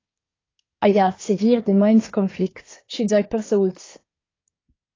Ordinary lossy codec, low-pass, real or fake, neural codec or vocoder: AAC, 32 kbps; 7.2 kHz; fake; codec, 24 kHz, 1 kbps, SNAC